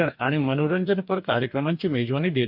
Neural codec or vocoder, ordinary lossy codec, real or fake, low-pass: codec, 44.1 kHz, 2.6 kbps, DAC; none; fake; 5.4 kHz